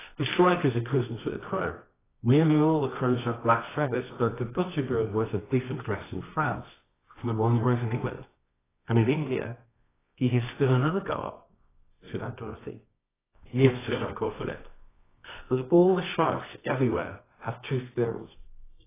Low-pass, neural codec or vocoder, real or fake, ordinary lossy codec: 3.6 kHz; codec, 24 kHz, 0.9 kbps, WavTokenizer, medium music audio release; fake; AAC, 16 kbps